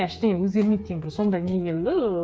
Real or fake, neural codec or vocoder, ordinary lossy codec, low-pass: fake; codec, 16 kHz, 4 kbps, FreqCodec, smaller model; none; none